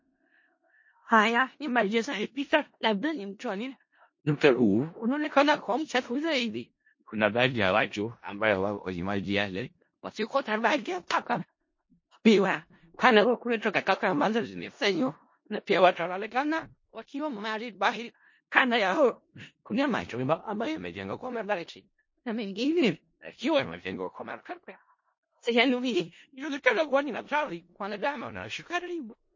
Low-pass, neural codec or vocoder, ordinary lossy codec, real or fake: 7.2 kHz; codec, 16 kHz in and 24 kHz out, 0.4 kbps, LongCat-Audio-Codec, four codebook decoder; MP3, 32 kbps; fake